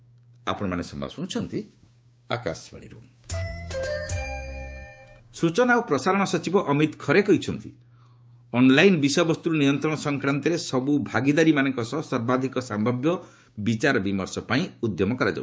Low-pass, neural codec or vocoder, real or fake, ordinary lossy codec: none; codec, 16 kHz, 6 kbps, DAC; fake; none